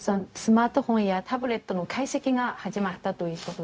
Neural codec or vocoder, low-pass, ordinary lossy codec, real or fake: codec, 16 kHz, 0.4 kbps, LongCat-Audio-Codec; none; none; fake